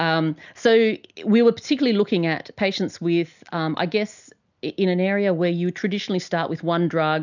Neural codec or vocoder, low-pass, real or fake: none; 7.2 kHz; real